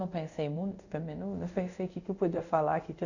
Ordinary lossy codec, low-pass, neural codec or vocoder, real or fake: none; 7.2 kHz; codec, 24 kHz, 0.5 kbps, DualCodec; fake